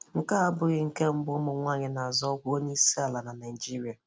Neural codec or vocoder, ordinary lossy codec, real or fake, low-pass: none; none; real; none